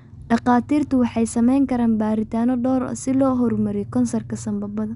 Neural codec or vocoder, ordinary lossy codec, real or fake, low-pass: none; Opus, 64 kbps; real; 10.8 kHz